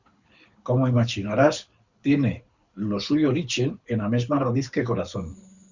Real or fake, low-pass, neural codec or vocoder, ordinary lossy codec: fake; 7.2 kHz; codec, 24 kHz, 6 kbps, HILCodec; Opus, 64 kbps